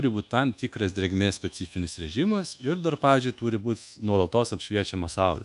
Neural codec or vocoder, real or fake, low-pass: codec, 24 kHz, 1.2 kbps, DualCodec; fake; 10.8 kHz